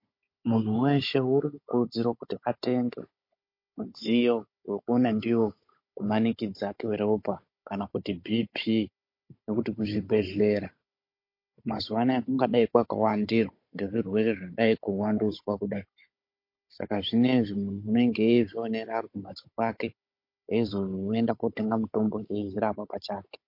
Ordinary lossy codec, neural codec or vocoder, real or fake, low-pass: MP3, 32 kbps; codec, 16 kHz, 16 kbps, FunCodec, trained on Chinese and English, 50 frames a second; fake; 5.4 kHz